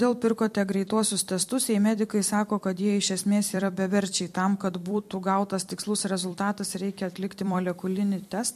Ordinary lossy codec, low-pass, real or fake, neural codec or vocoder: MP3, 64 kbps; 14.4 kHz; fake; vocoder, 44.1 kHz, 128 mel bands every 256 samples, BigVGAN v2